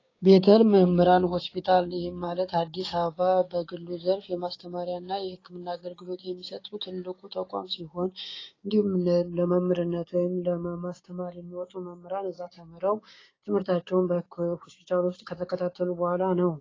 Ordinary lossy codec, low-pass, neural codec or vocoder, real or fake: AAC, 32 kbps; 7.2 kHz; codec, 16 kHz, 6 kbps, DAC; fake